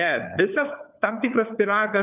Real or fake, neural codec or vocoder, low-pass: fake; codec, 16 kHz, 4 kbps, FunCodec, trained on LibriTTS, 50 frames a second; 3.6 kHz